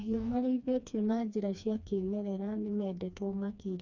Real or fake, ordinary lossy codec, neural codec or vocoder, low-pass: fake; none; codec, 16 kHz, 2 kbps, FreqCodec, smaller model; 7.2 kHz